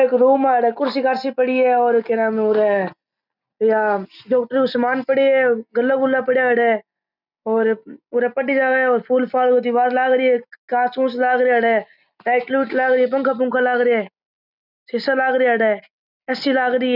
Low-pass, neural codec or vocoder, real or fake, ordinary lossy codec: 5.4 kHz; none; real; none